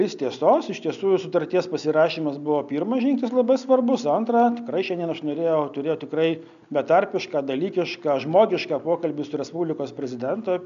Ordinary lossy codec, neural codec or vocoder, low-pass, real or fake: MP3, 96 kbps; none; 7.2 kHz; real